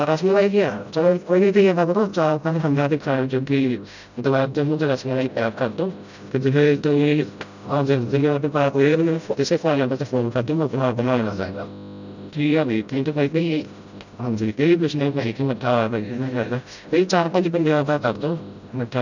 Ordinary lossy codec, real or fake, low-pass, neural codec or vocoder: none; fake; 7.2 kHz; codec, 16 kHz, 0.5 kbps, FreqCodec, smaller model